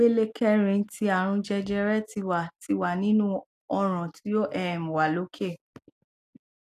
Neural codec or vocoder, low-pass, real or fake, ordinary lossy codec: none; 14.4 kHz; real; AAC, 64 kbps